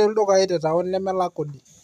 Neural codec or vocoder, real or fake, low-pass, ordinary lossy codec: none; real; 14.4 kHz; MP3, 96 kbps